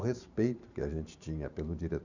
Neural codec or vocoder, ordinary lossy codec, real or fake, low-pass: vocoder, 44.1 kHz, 128 mel bands every 512 samples, BigVGAN v2; none; fake; 7.2 kHz